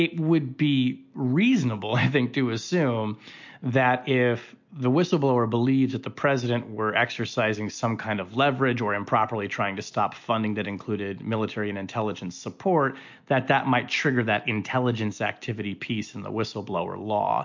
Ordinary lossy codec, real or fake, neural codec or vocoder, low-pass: MP3, 48 kbps; real; none; 7.2 kHz